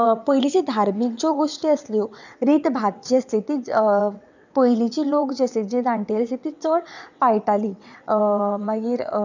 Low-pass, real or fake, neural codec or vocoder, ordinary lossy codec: 7.2 kHz; fake; vocoder, 22.05 kHz, 80 mel bands, WaveNeXt; none